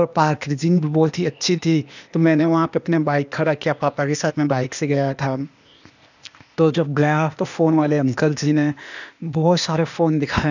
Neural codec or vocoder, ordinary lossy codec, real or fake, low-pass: codec, 16 kHz, 0.8 kbps, ZipCodec; none; fake; 7.2 kHz